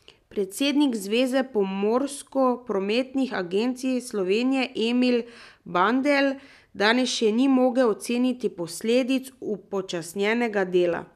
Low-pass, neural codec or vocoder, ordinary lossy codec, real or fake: 14.4 kHz; none; none; real